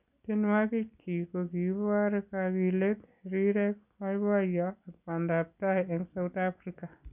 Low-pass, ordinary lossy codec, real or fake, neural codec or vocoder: 3.6 kHz; none; real; none